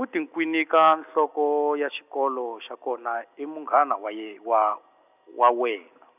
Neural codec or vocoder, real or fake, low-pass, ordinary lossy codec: none; real; 3.6 kHz; none